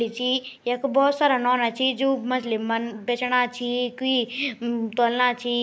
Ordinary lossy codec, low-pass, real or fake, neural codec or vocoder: none; none; real; none